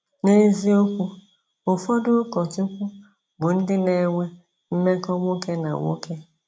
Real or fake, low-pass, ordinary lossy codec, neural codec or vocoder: real; none; none; none